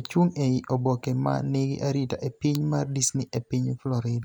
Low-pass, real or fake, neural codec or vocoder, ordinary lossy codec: none; real; none; none